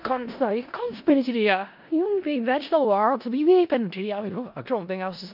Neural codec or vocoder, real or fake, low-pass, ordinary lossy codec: codec, 16 kHz in and 24 kHz out, 0.4 kbps, LongCat-Audio-Codec, four codebook decoder; fake; 5.4 kHz; MP3, 48 kbps